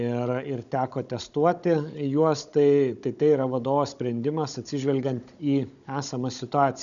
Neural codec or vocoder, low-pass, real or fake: codec, 16 kHz, 16 kbps, FunCodec, trained on Chinese and English, 50 frames a second; 7.2 kHz; fake